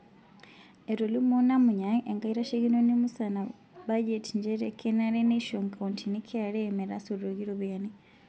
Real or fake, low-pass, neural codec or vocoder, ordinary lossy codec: real; none; none; none